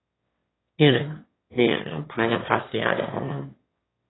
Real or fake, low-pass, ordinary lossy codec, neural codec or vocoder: fake; 7.2 kHz; AAC, 16 kbps; autoencoder, 22.05 kHz, a latent of 192 numbers a frame, VITS, trained on one speaker